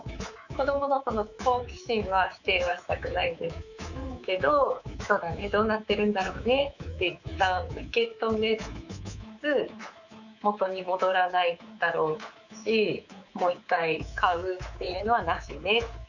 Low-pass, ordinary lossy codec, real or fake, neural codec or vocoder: 7.2 kHz; none; fake; codec, 24 kHz, 3.1 kbps, DualCodec